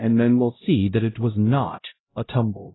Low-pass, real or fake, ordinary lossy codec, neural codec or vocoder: 7.2 kHz; fake; AAC, 16 kbps; codec, 16 kHz, 0.5 kbps, X-Codec, HuBERT features, trained on LibriSpeech